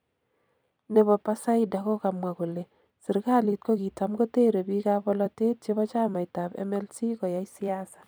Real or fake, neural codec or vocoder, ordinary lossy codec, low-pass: fake; vocoder, 44.1 kHz, 128 mel bands every 256 samples, BigVGAN v2; none; none